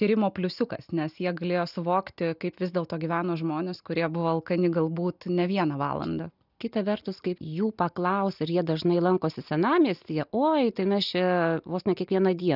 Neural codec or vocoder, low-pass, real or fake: none; 5.4 kHz; real